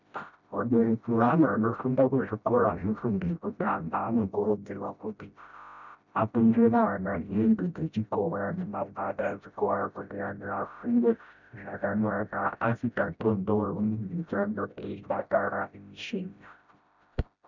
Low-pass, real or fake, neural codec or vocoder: 7.2 kHz; fake; codec, 16 kHz, 0.5 kbps, FreqCodec, smaller model